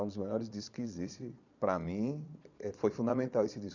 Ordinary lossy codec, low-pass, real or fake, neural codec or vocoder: none; 7.2 kHz; fake; vocoder, 22.05 kHz, 80 mel bands, Vocos